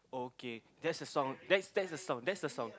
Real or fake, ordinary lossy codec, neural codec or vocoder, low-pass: real; none; none; none